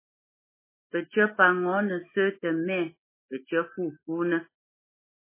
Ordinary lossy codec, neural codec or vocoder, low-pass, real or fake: MP3, 16 kbps; autoencoder, 48 kHz, 128 numbers a frame, DAC-VAE, trained on Japanese speech; 3.6 kHz; fake